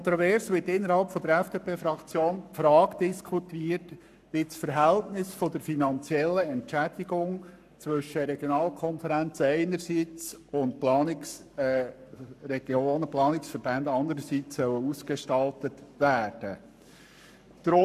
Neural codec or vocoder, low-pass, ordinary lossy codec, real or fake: codec, 44.1 kHz, 7.8 kbps, Pupu-Codec; 14.4 kHz; none; fake